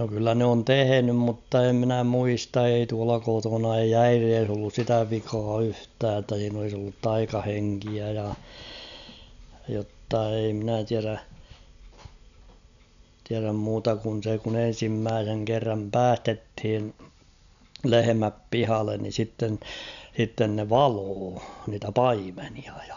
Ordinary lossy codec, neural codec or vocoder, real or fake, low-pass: none; none; real; 7.2 kHz